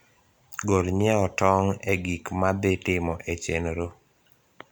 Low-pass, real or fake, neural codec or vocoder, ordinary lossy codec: none; real; none; none